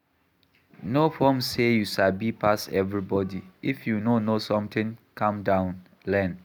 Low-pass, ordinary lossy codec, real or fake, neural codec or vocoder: none; none; real; none